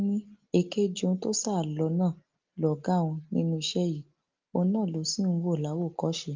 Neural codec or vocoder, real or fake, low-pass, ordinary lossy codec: none; real; 7.2 kHz; Opus, 32 kbps